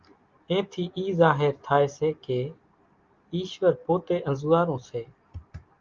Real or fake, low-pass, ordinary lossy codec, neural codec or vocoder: real; 7.2 kHz; Opus, 24 kbps; none